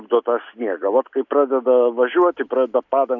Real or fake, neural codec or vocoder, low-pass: real; none; 7.2 kHz